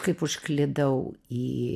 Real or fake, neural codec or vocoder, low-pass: real; none; 14.4 kHz